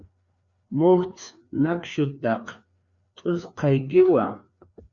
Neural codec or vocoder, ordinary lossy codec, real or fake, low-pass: codec, 16 kHz, 2 kbps, FreqCodec, larger model; Opus, 64 kbps; fake; 7.2 kHz